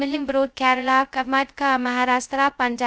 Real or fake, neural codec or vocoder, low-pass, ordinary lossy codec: fake; codec, 16 kHz, 0.2 kbps, FocalCodec; none; none